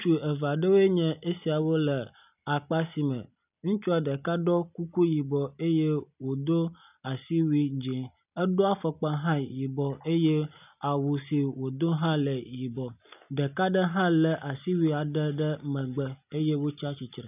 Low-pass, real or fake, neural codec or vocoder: 3.6 kHz; real; none